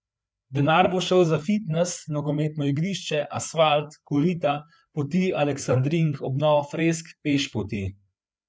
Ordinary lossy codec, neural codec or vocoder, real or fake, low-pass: none; codec, 16 kHz, 4 kbps, FreqCodec, larger model; fake; none